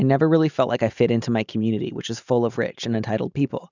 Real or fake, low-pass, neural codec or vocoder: real; 7.2 kHz; none